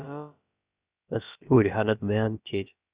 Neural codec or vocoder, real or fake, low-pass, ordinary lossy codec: codec, 16 kHz, about 1 kbps, DyCAST, with the encoder's durations; fake; 3.6 kHz; Opus, 64 kbps